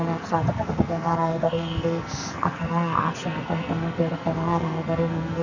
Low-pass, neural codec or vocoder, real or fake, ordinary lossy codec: 7.2 kHz; codec, 44.1 kHz, 2.6 kbps, SNAC; fake; Opus, 64 kbps